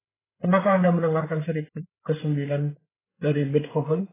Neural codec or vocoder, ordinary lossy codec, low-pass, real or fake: codec, 16 kHz, 8 kbps, FreqCodec, larger model; AAC, 16 kbps; 3.6 kHz; fake